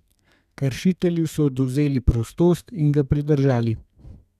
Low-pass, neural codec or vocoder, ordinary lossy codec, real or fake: 14.4 kHz; codec, 32 kHz, 1.9 kbps, SNAC; none; fake